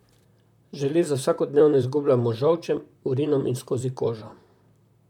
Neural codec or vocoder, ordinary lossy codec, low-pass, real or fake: vocoder, 44.1 kHz, 128 mel bands, Pupu-Vocoder; none; 19.8 kHz; fake